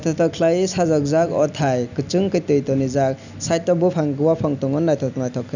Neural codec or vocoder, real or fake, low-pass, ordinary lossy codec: none; real; 7.2 kHz; none